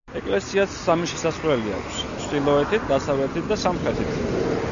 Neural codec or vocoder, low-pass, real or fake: none; 7.2 kHz; real